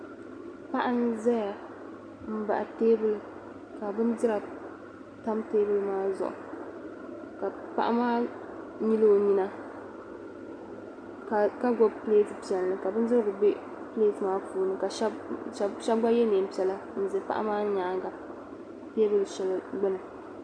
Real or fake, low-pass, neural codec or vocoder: real; 9.9 kHz; none